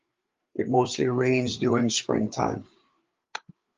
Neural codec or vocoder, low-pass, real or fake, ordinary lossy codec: codec, 16 kHz, 4 kbps, FreqCodec, larger model; 7.2 kHz; fake; Opus, 32 kbps